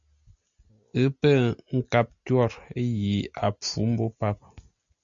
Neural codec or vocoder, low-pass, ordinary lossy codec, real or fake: none; 7.2 kHz; MP3, 64 kbps; real